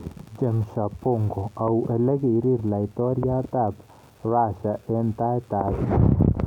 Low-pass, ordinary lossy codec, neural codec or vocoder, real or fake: 19.8 kHz; none; none; real